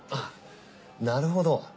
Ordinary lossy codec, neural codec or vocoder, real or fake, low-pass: none; none; real; none